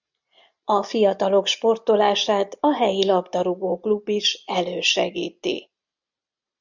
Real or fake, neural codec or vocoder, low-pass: real; none; 7.2 kHz